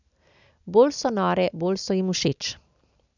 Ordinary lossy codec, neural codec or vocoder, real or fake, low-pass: none; none; real; 7.2 kHz